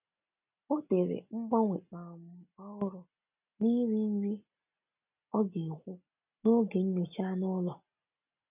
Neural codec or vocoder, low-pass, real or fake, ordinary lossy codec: none; 3.6 kHz; real; none